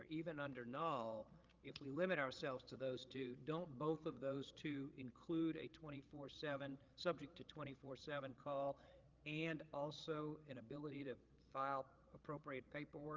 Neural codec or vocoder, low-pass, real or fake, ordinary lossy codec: codec, 16 kHz, 8 kbps, FreqCodec, larger model; 7.2 kHz; fake; Opus, 32 kbps